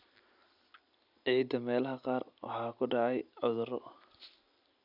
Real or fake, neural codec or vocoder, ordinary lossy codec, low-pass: real; none; none; 5.4 kHz